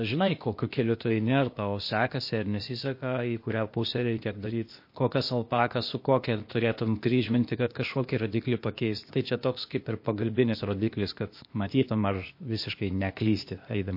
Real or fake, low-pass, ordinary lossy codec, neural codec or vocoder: fake; 5.4 kHz; MP3, 32 kbps; codec, 16 kHz, 0.8 kbps, ZipCodec